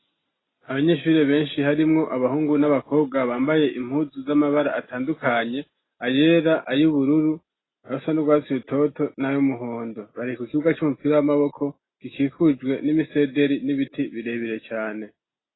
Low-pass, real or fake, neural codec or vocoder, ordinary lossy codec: 7.2 kHz; real; none; AAC, 16 kbps